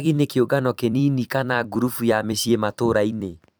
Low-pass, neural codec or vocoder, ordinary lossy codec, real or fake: none; vocoder, 44.1 kHz, 128 mel bands every 256 samples, BigVGAN v2; none; fake